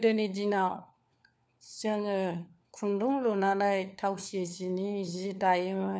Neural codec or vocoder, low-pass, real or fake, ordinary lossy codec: codec, 16 kHz, 4 kbps, FunCodec, trained on LibriTTS, 50 frames a second; none; fake; none